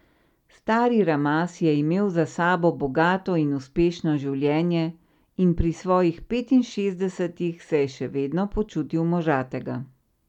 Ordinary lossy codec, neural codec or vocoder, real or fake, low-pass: none; none; real; 19.8 kHz